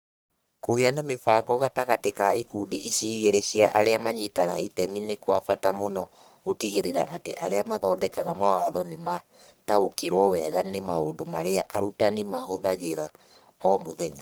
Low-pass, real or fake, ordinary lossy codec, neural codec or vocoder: none; fake; none; codec, 44.1 kHz, 1.7 kbps, Pupu-Codec